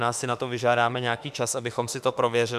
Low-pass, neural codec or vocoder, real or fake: 14.4 kHz; autoencoder, 48 kHz, 32 numbers a frame, DAC-VAE, trained on Japanese speech; fake